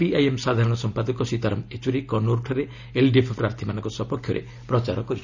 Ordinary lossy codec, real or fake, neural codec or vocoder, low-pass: none; real; none; 7.2 kHz